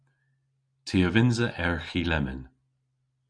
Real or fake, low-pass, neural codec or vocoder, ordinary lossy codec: real; 9.9 kHz; none; MP3, 96 kbps